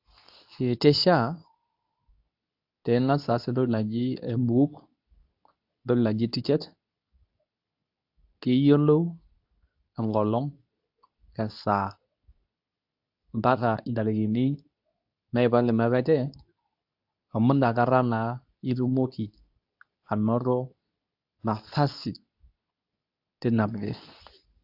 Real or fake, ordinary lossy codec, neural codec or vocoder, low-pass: fake; AAC, 48 kbps; codec, 24 kHz, 0.9 kbps, WavTokenizer, medium speech release version 2; 5.4 kHz